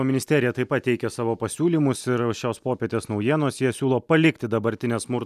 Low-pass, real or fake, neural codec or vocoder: 14.4 kHz; real; none